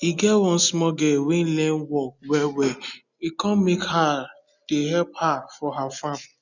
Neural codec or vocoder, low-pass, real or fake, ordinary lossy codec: none; 7.2 kHz; real; none